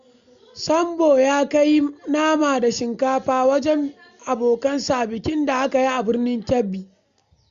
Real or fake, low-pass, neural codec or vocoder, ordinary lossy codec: real; 7.2 kHz; none; Opus, 64 kbps